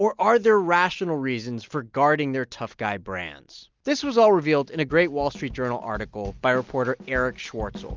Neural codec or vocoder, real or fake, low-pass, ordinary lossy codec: none; real; 7.2 kHz; Opus, 32 kbps